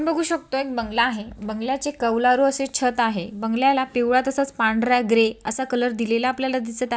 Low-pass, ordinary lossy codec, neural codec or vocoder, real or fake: none; none; none; real